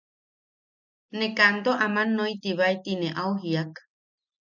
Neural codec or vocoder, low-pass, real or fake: none; 7.2 kHz; real